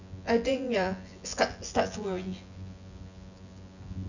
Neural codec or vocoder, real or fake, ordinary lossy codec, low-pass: vocoder, 24 kHz, 100 mel bands, Vocos; fake; none; 7.2 kHz